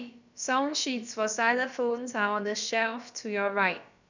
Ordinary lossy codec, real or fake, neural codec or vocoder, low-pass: none; fake; codec, 16 kHz, about 1 kbps, DyCAST, with the encoder's durations; 7.2 kHz